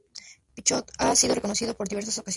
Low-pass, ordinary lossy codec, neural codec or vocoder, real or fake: 10.8 kHz; AAC, 64 kbps; none; real